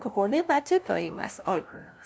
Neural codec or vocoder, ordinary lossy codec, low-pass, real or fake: codec, 16 kHz, 0.5 kbps, FunCodec, trained on LibriTTS, 25 frames a second; none; none; fake